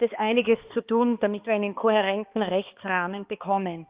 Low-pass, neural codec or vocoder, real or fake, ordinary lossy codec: 3.6 kHz; codec, 16 kHz, 2 kbps, X-Codec, HuBERT features, trained on balanced general audio; fake; Opus, 24 kbps